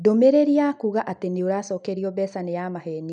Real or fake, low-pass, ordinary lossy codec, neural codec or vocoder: real; 9.9 kHz; none; none